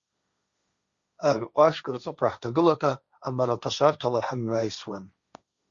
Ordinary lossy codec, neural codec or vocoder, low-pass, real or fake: Opus, 64 kbps; codec, 16 kHz, 1.1 kbps, Voila-Tokenizer; 7.2 kHz; fake